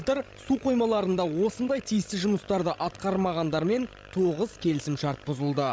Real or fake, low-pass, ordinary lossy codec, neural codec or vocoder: fake; none; none; codec, 16 kHz, 16 kbps, FreqCodec, larger model